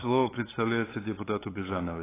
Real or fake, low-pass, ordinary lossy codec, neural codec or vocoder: fake; 3.6 kHz; AAC, 16 kbps; codec, 16 kHz, 8 kbps, FunCodec, trained on LibriTTS, 25 frames a second